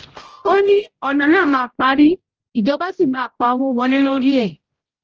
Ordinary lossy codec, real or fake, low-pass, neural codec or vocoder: Opus, 16 kbps; fake; 7.2 kHz; codec, 16 kHz, 0.5 kbps, X-Codec, HuBERT features, trained on general audio